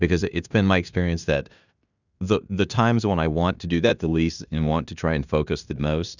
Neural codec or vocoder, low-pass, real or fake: codec, 16 kHz in and 24 kHz out, 0.9 kbps, LongCat-Audio-Codec, fine tuned four codebook decoder; 7.2 kHz; fake